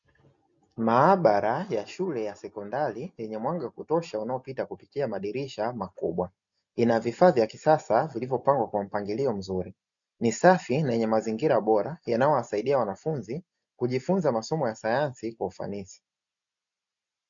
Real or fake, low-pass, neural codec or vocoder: real; 7.2 kHz; none